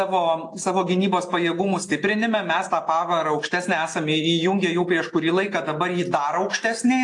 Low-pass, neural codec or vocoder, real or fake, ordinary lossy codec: 10.8 kHz; none; real; AAC, 48 kbps